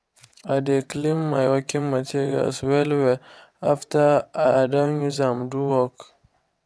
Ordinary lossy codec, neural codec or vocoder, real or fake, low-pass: none; vocoder, 22.05 kHz, 80 mel bands, WaveNeXt; fake; none